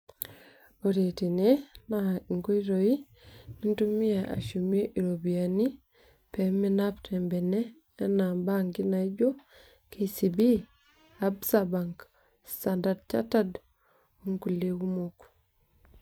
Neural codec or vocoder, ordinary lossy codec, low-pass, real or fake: vocoder, 44.1 kHz, 128 mel bands every 256 samples, BigVGAN v2; none; none; fake